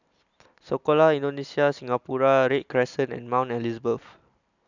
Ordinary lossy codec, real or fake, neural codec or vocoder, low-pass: none; real; none; 7.2 kHz